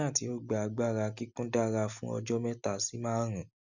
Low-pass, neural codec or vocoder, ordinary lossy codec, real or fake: 7.2 kHz; none; none; real